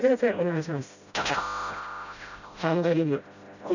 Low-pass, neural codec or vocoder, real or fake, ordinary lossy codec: 7.2 kHz; codec, 16 kHz, 0.5 kbps, FreqCodec, smaller model; fake; none